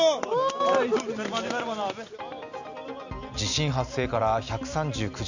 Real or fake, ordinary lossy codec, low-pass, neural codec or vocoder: real; none; 7.2 kHz; none